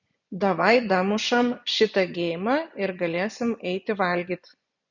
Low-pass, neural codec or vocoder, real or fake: 7.2 kHz; vocoder, 24 kHz, 100 mel bands, Vocos; fake